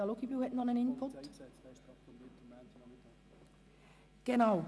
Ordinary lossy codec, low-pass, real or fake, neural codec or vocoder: none; none; real; none